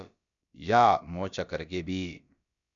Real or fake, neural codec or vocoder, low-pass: fake; codec, 16 kHz, about 1 kbps, DyCAST, with the encoder's durations; 7.2 kHz